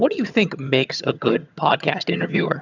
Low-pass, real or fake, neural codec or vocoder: 7.2 kHz; fake; vocoder, 22.05 kHz, 80 mel bands, HiFi-GAN